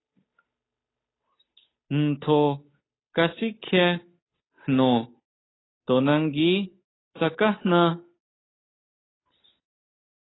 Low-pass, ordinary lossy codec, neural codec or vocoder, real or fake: 7.2 kHz; AAC, 16 kbps; codec, 16 kHz, 8 kbps, FunCodec, trained on Chinese and English, 25 frames a second; fake